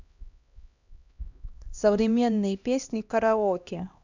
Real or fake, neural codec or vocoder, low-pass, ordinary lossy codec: fake; codec, 16 kHz, 1 kbps, X-Codec, HuBERT features, trained on LibriSpeech; 7.2 kHz; none